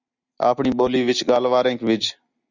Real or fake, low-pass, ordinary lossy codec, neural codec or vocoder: real; 7.2 kHz; Opus, 64 kbps; none